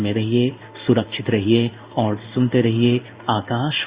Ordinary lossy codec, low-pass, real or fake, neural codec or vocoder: Opus, 64 kbps; 3.6 kHz; fake; codec, 16 kHz in and 24 kHz out, 1 kbps, XY-Tokenizer